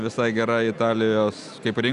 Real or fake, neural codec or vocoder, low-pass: real; none; 10.8 kHz